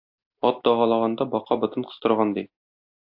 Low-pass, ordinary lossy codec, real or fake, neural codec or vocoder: 5.4 kHz; AAC, 48 kbps; real; none